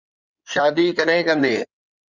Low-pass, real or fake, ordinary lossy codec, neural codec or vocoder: 7.2 kHz; fake; Opus, 64 kbps; codec, 16 kHz in and 24 kHz out, 2.2 kbps, FireRedTTS-2 codec